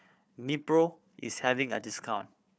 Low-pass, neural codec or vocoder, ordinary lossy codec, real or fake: none; codec, 16 kHz, 4 kbps, FunCodec, trained on Chinese and English, 50 frames a second; none; fake